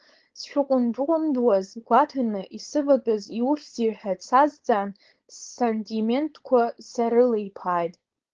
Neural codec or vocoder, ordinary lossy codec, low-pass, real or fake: codec, 16 kHz, 4.8 kbps, FACodec; Opus, 16 kbps; 7.2 kHz; fake